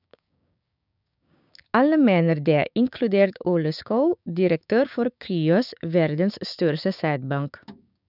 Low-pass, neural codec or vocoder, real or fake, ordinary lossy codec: 5.4 kHz; codec, 16 kHz, 6 kbps, DAC; fake; none